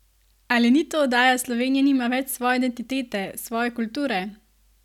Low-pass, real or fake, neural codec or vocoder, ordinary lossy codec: 19.8 kHz; real; none; none